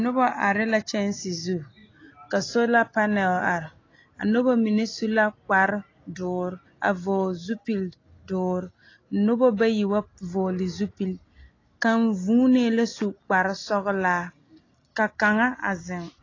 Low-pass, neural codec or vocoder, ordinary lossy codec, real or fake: 7.2 kHz; none; AAC, 32 kbps; real